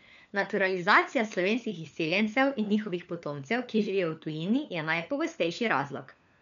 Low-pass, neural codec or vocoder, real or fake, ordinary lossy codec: 7.2 kHz; codec, 16 kHz, 4 kbps, FunCodec, trained on LibriTTS, 50 frames a second; fake; none